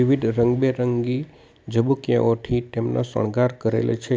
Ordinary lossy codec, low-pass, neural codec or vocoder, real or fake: none; none; none; real